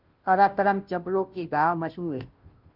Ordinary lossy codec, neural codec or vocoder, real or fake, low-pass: Opus, 24 kbps; codec, 16 kHz, 0.5 kbps, FunCodec, trained on Chinese and English, 25 frames a second; fake; 5.4 kHz